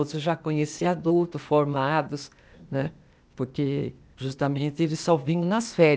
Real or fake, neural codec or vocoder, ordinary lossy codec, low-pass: fake; codec, 16 kHz, 0.8 kbps, ZipCodec; none; none